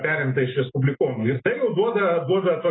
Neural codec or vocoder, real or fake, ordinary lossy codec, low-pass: none; real; AAC, 16 kbps; 7.2 kHz